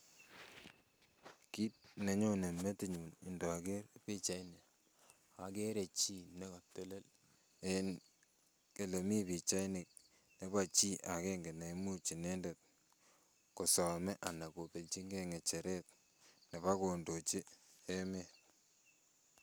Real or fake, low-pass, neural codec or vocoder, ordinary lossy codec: real; none; none; none